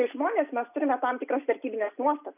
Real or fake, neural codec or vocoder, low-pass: real; none; 3.6 kHz